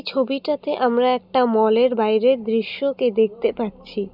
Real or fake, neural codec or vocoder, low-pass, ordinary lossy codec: real; none; 5.4 kHz; none